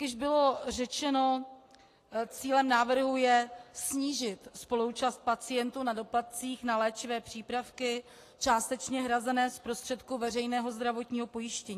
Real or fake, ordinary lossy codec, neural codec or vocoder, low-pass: real; AAC, 48 kbps; none; 14.4 kHz